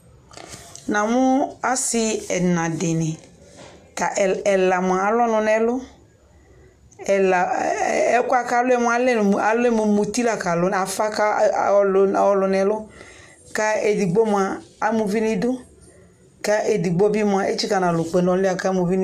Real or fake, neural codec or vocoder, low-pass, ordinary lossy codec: real; none; 14.4 kHz; AAC, 96 kbps